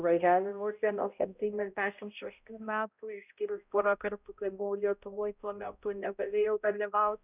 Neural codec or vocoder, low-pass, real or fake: codec, 16 kHz, 0.5 kbps, X-Codec, HuBERT features, trained on balanced general audio; 3.6 kHz; fake